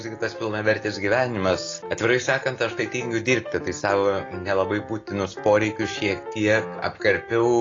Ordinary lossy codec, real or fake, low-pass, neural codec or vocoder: AAC, 32 kbps; real; 7.2 kHz; none